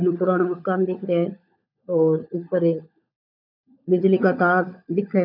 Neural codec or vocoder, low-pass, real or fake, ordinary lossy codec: codec, 16 kHz, 16 kbps, FunCodec, trained on LibriTTS, 50 frames a second; 5.4 kHz; fake; none